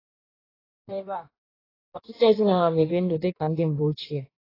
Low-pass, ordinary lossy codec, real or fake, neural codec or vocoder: 5.4 kHz; AAC, 24 kbps; fake; codec, 16 kHz in and 24 kHz out, 1.1 kbps, FireRedTTS-2 codec